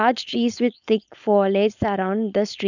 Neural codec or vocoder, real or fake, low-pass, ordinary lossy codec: codec, 16 kHz, 4.8 kbps, FACodec; fake; 7.2 kHz; none